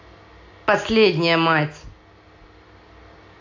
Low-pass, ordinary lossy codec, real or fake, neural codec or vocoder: 7.2 kHz; AAC, 48 kbps; real; none